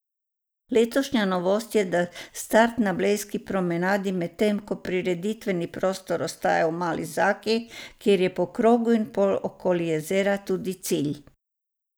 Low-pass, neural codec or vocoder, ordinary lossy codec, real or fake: none; none; none; real